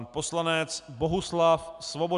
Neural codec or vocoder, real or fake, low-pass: none; real; 10.8 kHz